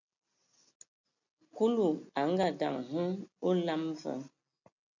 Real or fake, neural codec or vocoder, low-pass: real; none; 7.2 kHz